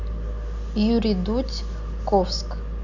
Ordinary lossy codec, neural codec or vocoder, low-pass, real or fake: none; none; 7.2 kHz; real